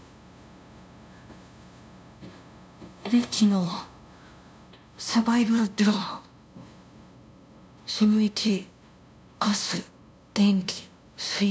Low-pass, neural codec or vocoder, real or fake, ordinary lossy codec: none; codec, 16 kHz, 0.5 kbps, FunCodec, trained on LibriTTS, 25 frames a second; fake; none